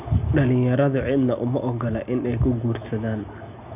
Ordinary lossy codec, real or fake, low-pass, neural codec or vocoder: none; real; 3.6 kHz; none